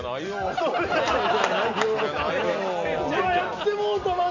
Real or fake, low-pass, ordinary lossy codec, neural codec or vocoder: real; 7.2 kHz; none; none